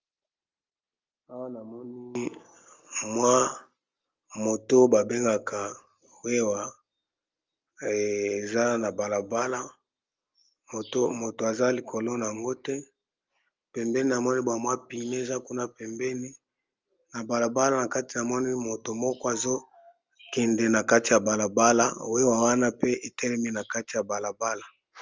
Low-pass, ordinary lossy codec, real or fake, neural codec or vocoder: 7.2 kHz; Opus, 32 kbps; real; none